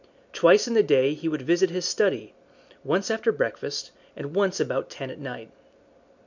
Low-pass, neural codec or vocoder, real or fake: 7.2 kHz; none; real